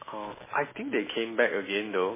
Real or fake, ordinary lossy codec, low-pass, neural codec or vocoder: real; MP3, 16 kbps; 3.6 kHz; none